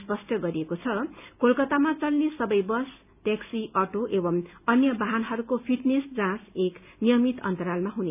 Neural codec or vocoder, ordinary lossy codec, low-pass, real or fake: none; none; 3.6 kHz; real